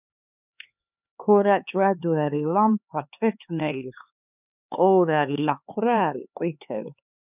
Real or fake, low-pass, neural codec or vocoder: fake; 3.6 kHz; codec, 16 kHz, 4 kbps, X-Codec, HuBERT features, trained on LibriSpeech